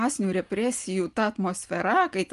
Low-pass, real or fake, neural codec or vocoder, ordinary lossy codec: 10.8 kHz; real; none; Opus, 32 kbps